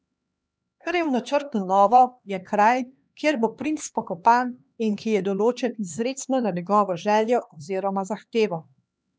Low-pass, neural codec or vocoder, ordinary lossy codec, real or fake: none; codec, 16 kHz, 2 kbps, X-Codec, HuBERT features, trained on LibriSpeech; none; fake